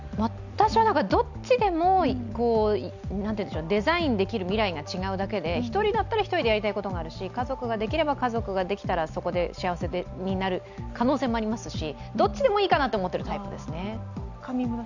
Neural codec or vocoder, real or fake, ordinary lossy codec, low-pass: none; real; none; 7.2 kHz